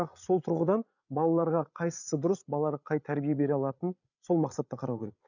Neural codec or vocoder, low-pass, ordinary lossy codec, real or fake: codec, 16 kHz, 8 kbps, FreqCodec, larger model; 7.2 kHz; none; fake